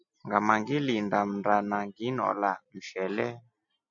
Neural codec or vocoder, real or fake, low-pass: none; real; 7.2 kHz